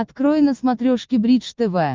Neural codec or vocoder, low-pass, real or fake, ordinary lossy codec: none; 7.2 kHz; real; Opus, 32 kbps